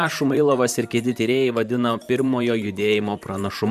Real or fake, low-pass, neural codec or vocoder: fake; 14.4 kHz; vocoder, 44.1 kHz, 128 mel bands, Pupu-Vocoder